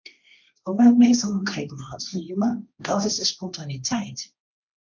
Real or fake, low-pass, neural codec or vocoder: fake; 7.2 kHz; codec, 16 kHz, 1.1 kbps, Voila-Tokenizer